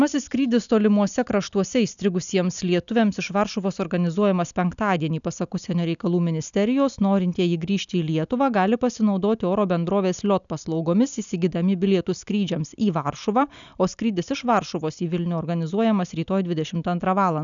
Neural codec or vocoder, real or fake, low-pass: none; real; 7.2 kHz